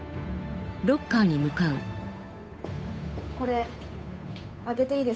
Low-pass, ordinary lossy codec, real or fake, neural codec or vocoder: none; none; fake; codec, 16 kHz, 8 kbps, FunCodec, trained on Chinese and English, 25 frames a second